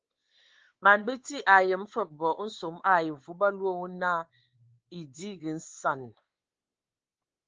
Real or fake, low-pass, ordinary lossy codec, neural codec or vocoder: fake; 7.2 kHz; Opus, 16 kbps; codec, 16 kHz, 4 kbps, X-Codec, WavLM features, trained on Multilingual LibriSpeech